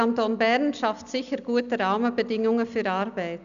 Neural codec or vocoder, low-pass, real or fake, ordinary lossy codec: none; 7.2 kHz; real; none